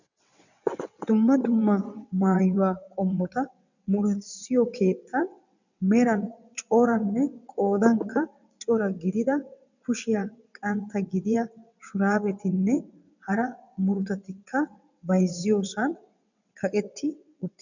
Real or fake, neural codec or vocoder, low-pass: fake; vocoder, 22.05 kHz, 80 mel bands, WaveNeXt; 7.2 kHz